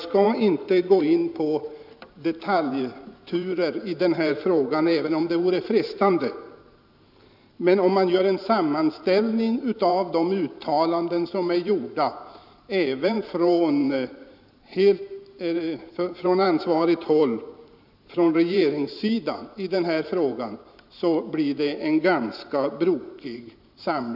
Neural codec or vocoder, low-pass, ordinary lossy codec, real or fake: vocoder, 44.1 kHz, 128 mel bands every 512 samples, BigVGAN v2; 5.4 kHz; none; fake